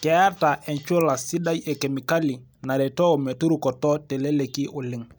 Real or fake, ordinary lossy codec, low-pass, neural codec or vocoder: real; none; none; none